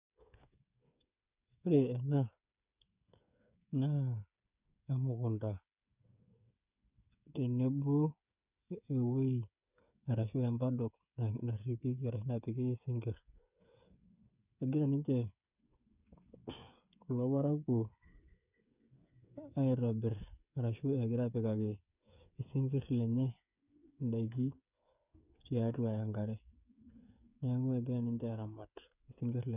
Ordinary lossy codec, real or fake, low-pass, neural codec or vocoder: none; fake; 3.6 kHz; codec, 16 kHz, 8 kbps, FreqCodec, smaller model